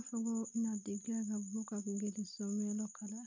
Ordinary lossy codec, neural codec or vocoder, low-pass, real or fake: none; none; 7.2 kHz; real